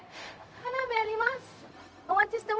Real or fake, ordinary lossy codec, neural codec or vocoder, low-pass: fake; none; codec, 16 kHz, 0.4 kbps, LongCat-Audio-Codec; none